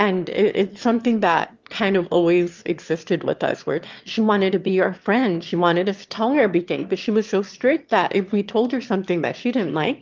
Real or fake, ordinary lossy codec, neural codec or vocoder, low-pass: fake; Opus, 32 kbps; autoencoder, 22.05 kHz, a latent of 192 numbers a frame, VITS, trained on one speaker; 7.2 kHz